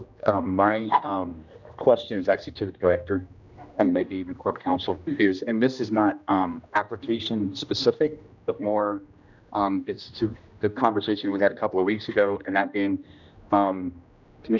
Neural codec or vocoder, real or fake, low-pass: codec, 16 kHz, 1 kbps, X-Codec, HuBERT features, trained on general audio; fake; 7.2 kHz